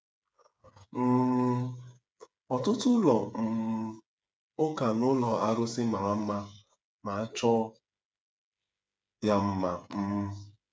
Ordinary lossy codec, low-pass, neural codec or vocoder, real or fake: none; none; codec, 16 kHz, 8 kbps, FreqCodec, smaller model; fake